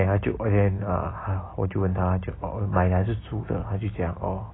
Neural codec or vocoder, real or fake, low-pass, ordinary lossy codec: none; real; 7.2 kHz; AAC, 16 kbps